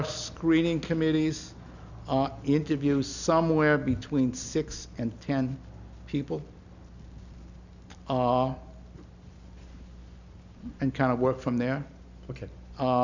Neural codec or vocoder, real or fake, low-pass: none; real; 7.2 kHz